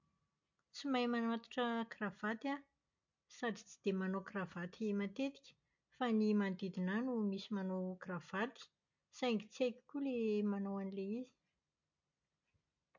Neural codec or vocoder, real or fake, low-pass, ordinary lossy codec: none; real; 7.2 kHz; MP3, 48 kbps